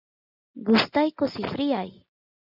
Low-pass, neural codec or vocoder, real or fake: 5.4 kHz; none; real